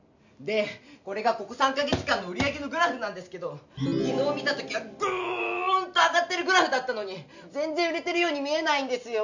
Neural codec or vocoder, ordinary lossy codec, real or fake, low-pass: none; none; real; 7.2 kHz